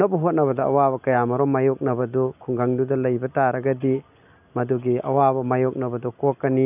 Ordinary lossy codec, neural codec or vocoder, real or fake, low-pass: none; none; real; 3.6 kHz